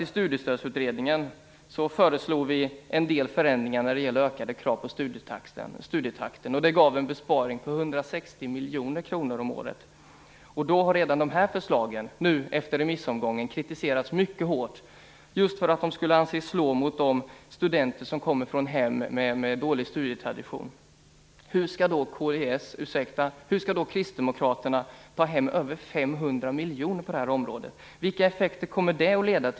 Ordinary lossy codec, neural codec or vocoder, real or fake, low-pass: none; none; real; none